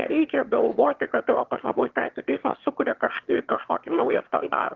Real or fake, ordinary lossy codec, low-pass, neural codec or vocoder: fake; Opus, 16 kbps; 7.2 kHz; autoencoder, 22.05 kHz, a latent of 192 numbers a frame, VITS, trained on one speaker